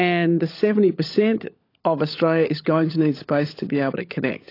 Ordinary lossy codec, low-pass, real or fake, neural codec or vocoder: AAC, 32 kbps; 5.4 kHz; fake; codec, 16 kHz, 16 kbps, FunCodec, trained on LibriTTS, 50 frames a second